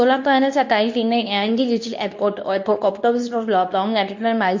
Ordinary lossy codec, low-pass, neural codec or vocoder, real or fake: MP3, 48 kbps; 7.2 kHz; codec, 24 kHz, 0.9 kbps, WavTokenizer, medium speech release version 2; fake